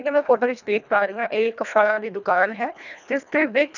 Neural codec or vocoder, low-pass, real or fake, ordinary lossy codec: codec, 24 kHz, 1.5 kbps, HILCodec; 7.2 kHz; fake; none